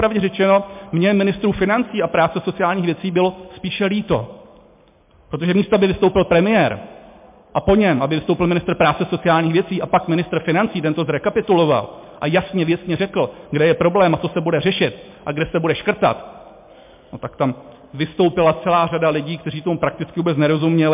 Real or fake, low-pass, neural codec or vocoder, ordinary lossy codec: real; 3.6 kHz; none; MP3, 32 kbps